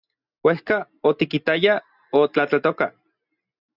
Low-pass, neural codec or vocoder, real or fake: 5.4 kHz; none; real